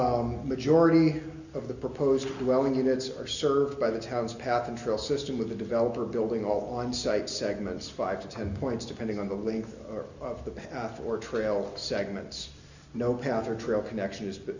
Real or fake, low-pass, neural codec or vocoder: real; 7.2 kHz; none